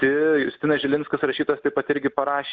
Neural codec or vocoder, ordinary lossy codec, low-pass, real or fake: none; Opus, 32 kbps; 7.2 kHz; real